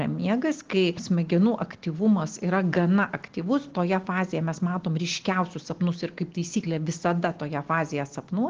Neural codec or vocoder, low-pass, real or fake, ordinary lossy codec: none; 7.2 kHz; real; Opus, 16 kbps